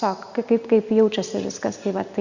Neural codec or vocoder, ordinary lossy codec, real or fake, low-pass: none; Opus, 64 kbps; real; 7.2 kHz